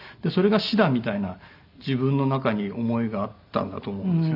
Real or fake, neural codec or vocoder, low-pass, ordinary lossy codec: real; none; 5.4 kHz; none